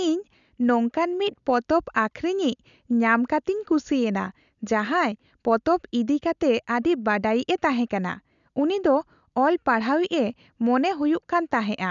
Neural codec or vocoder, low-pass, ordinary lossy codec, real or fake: none; 7.2 kHz; none; real